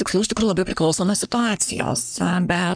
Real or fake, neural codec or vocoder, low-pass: fake; codec, 44.1 kHz, 3.4 kbps, Pupu-Codec; 9.9 kHz